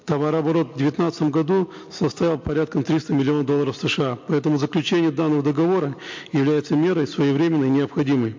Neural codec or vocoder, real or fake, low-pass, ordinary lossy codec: none; real; 7.2 kHz; MP3, 48 kbps